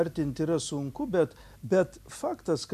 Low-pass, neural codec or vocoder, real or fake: 14.4 kHz; none; real